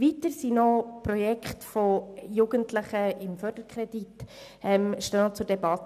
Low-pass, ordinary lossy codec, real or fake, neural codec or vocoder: 14.4 kHz; none; real; none